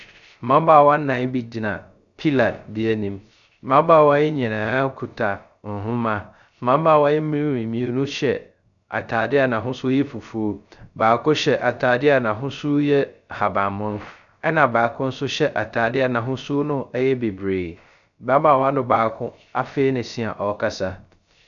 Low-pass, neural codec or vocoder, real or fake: 7.2 kHz; codec, 16 kHz, 0.3 kbps, FocalCodec; fake